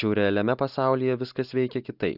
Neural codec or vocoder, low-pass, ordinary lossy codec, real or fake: none; 5.4 kHz; Opus, 64 kbps; real